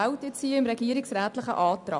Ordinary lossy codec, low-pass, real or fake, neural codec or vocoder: none; 10.8 kHz; real; none